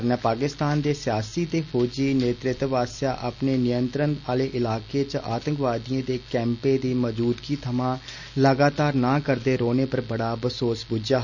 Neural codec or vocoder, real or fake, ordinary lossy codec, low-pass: none; real; none; 7.2 kHz